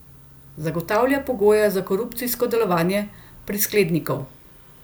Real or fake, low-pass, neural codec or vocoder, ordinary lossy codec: real; none; none; none